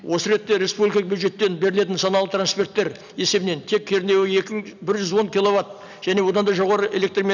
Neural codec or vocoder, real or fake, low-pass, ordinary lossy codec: none; real; 7.2 kHz; none